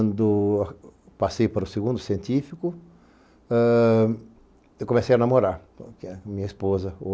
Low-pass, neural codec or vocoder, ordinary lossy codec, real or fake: none; none; none; real